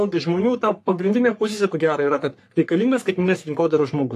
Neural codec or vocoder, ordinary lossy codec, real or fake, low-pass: codec, 44.1 kHz, 3.4 kbps, Pupu-Codec; AAC, 64 kbps; fake; 14.4 kHz